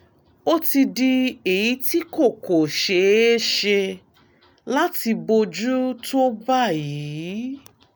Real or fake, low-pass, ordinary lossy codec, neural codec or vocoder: real; none; none; none